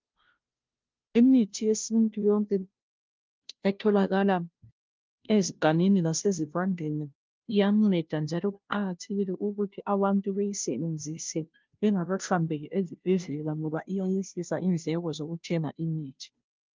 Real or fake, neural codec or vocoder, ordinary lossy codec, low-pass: fake; codec, 16 kHz, 0.5 kbps, FunCodec, trained on Chinese and English, 25 frames a second; Opus, 32 kbps; 7.2 kHz